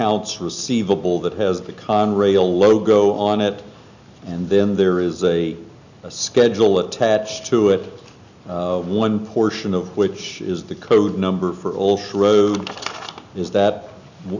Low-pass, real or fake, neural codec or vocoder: 7.2 kHz; real; none